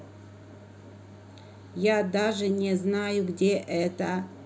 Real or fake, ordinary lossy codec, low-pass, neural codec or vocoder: real; none; none; none